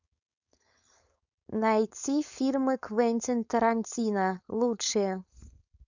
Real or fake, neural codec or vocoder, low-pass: fake; codec, 16 kHz, 4.8 kbps, FACodec; 7.2 kHz